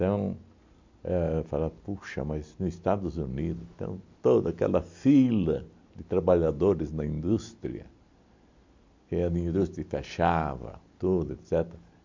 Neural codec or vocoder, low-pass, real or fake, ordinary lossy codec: none; 7.2 kHz; real; MP3, 48 kbps